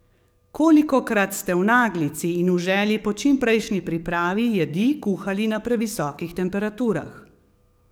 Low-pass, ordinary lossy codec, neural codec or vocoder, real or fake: none; none; codec, 44.1 kHz, 7.8 kbps, DAC; fake